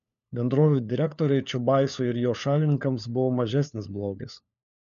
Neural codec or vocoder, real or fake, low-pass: codec, 16 kHz, 4 kbps, FunCodec, trained on LibriTTS, 50 frames a second; fake; 7.2 kHz